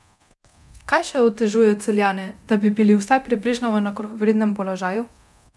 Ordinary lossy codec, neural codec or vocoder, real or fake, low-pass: none; codec, 24 kHz, 0.9 kbps, DualCodec; fake; none